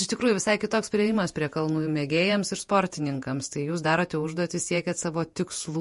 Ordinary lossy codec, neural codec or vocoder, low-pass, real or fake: MP3, 48 kbps; vocoder, 48 kHz, 128 mel bands, Vocos; 14.4 kHz; fake